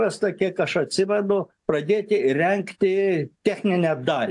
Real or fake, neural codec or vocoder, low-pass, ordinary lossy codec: real; none; 10.8 kHz; AAC, 64 kbps